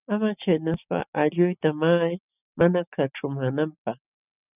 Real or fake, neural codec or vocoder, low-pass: real; none; 3.6 kHz